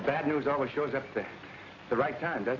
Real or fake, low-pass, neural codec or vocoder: real; 7.2 kHz; none